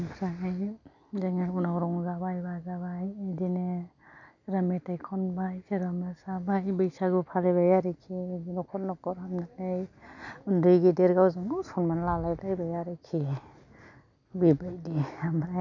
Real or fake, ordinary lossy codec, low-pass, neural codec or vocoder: real; none; 7.2 kHz; none